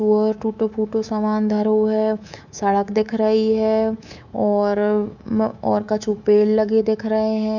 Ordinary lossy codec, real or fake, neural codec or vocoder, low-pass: none; fake; codec, 24 kHz, 3.1 kbps, DualCodec; 7.2 kHz